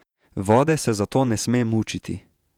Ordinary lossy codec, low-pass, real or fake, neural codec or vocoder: none; 19.8 kHz; fake; vocoder, 48 kHz, 128 mel bands, Vocos